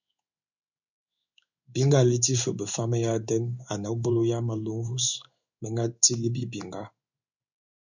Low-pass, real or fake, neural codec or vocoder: 7.2 kHz; fake; codec, 16 kHz in and 24 kHz out, 1 kbps, XY-Tokenizer